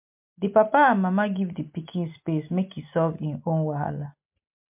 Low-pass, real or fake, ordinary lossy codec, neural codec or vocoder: 3.6 kHz; real; MP3, 32 kbps; none